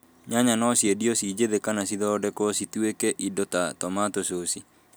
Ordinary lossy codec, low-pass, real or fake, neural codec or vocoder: none; none; real; none